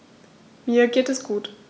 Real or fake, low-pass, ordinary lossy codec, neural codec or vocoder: real; none; none; none